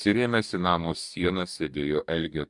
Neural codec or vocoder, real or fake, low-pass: codec, 44.1 kHz, 2.6 kbps, DAC; fake; 10.8 kHz